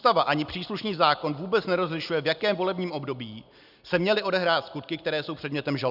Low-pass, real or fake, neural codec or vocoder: 5.4 kHz; real; none